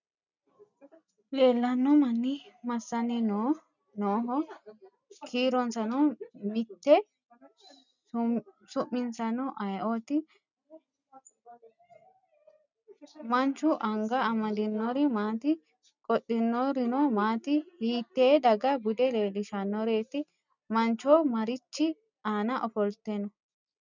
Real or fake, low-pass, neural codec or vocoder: real; 7.2 kHz; none